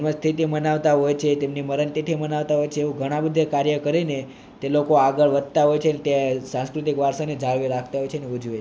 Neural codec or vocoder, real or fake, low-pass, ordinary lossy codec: none; real; none; none